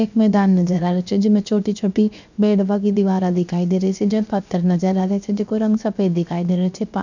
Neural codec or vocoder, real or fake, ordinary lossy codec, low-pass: codec, 16 kHz, 0.7 kbps, FocalCodec; fake; none; 7.2 kHz